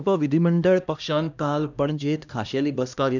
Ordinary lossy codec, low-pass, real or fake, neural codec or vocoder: none; 7.2 kHz; fake; codec, 16 kHz, 1 kbps, X-Codec, HuBERT features, trained on LibriSpeech